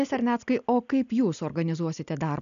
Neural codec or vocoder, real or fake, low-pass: none; real; 7.2 kHz